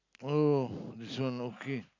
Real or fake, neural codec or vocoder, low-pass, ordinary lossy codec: real; none; 7.2 kHz; none